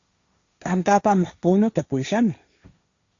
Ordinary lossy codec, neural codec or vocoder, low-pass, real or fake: Opus, 64 kbps; codec, 16 kHz, 1.1 kbps, Voila-Tokenizer; 7.2 kHz; fake